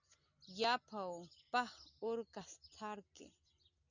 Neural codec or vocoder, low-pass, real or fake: none; 7.2 kHz; real